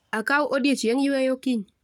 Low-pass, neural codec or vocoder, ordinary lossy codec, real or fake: 19.8 kHz; codec, 44.1 kHz, 7.8 kbps, Pupu-Codec; none; fake